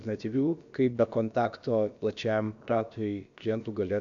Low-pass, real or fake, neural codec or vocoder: 7.2 kHz; fake; codec, 16 kHz, about 1 kbps, DyCAST, with the encoder's durations